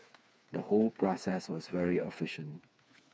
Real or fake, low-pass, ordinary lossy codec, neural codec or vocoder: fake; none; none; codec, 16 kHz, 4 kbps, FreqCodec, smaller model